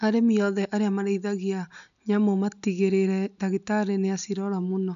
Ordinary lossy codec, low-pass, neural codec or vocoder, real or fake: none; 7.2 kHz; none; real